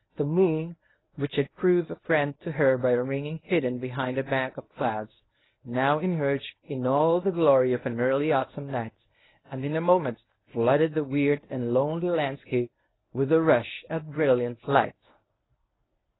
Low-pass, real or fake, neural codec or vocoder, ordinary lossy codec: 7.2 kHz; fake; codec, 24 kHz, 0.9 kbps, WavTokenizer, medium speech release version 1; AAC, 16 kbps